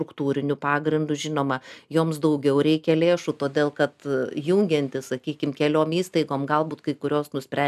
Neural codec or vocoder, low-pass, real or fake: none; 14.4 kHz; real